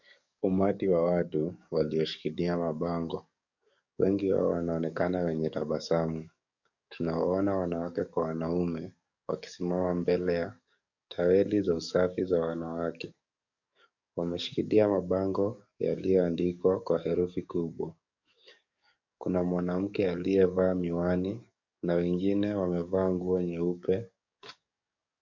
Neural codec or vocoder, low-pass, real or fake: codec, 44.1 kHz, 7.8 kbps, DAC; 7.2 kHz; fake